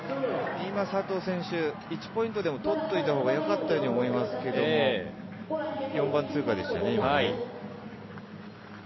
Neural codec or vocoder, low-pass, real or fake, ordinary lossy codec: none; 7.2 kHz; real; MP3, 24 kbps